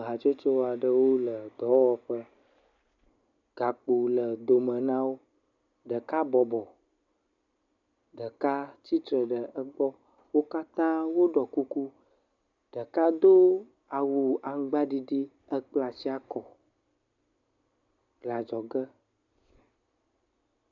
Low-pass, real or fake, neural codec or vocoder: 7.2 kHz; real; none